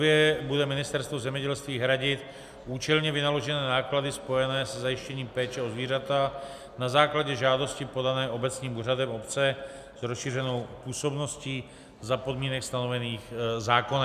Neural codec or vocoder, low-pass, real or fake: none; 14.4 kHz; real